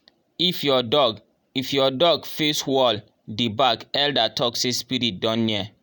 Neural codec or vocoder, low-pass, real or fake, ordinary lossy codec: none; none; real; none